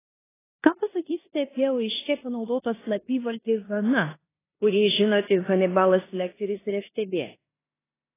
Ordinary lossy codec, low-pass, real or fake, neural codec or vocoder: AAC, 16 kbps; 3.6 kHz; fake; codec, 16 kHz in and 24 kHz out, 0.9 kbps, LongCat-Audio-Codec, four codebook decoder